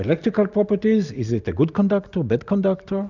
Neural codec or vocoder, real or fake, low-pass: none; real; 7.2 kHz